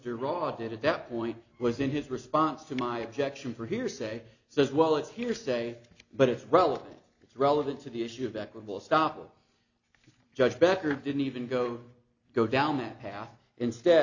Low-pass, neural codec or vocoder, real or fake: 7.2 kHz; none; real